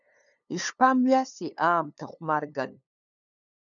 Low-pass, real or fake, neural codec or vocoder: 7.2 kHz; fake; codec, 16 kHz, 2 kbps, FunCodec, trained on LibriTTS, 25 frames a second